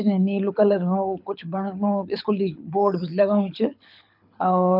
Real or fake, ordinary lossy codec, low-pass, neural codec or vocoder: fake; none; 5.4 kHz; codec, 24 kHz, 6 kbps, HILCodec